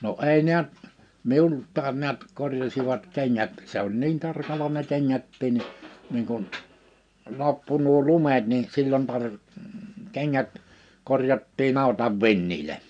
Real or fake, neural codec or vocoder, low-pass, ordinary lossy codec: real; none; 9.9 kHz; none